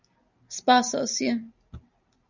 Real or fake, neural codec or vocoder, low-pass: real; none; 7.2 kHz